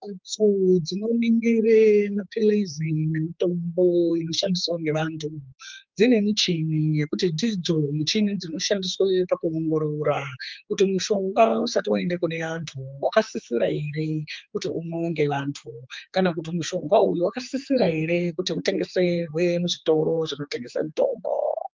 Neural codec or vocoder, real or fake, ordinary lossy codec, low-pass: codec, 16 kHz, 4 kbps, X-Codec, HuBERT features, trained on general audio; fake; Opus, 24 kbps; 7.2 kHz